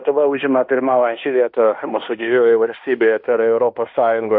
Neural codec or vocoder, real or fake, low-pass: codec, 16 kHz in and 24 kHz out, 0.9 kbps, LongCat-Audio-Codec, fine tuned four codebook decoder; fake; 5.4 kHz